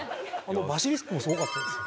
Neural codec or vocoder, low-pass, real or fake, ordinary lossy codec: none; none; real; none